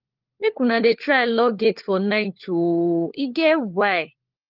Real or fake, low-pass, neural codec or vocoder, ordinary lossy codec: fake; 5.4 kHz; codec, 16 kHz, 4 kbps, FunCodec, trained on LibriTTS, 50 frames a second; Opus, 32 kbps